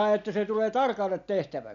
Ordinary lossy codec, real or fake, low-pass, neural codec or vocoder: none; real; 7.2 kHz; none